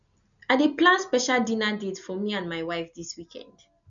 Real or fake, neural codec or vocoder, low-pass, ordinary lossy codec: real; none; 7.2 kHz; none